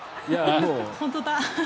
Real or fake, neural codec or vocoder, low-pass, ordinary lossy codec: real; none; none; none